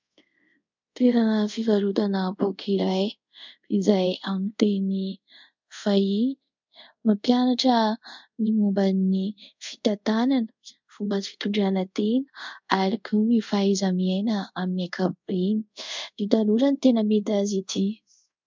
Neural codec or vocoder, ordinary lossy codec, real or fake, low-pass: codec, 24 kHz, 0.5 kbps, DualCodec; MP3, 64 kbps; fake; 7.2 kHz